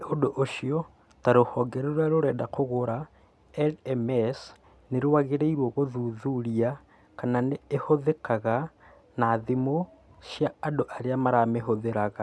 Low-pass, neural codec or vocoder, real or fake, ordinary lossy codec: none; none; real; none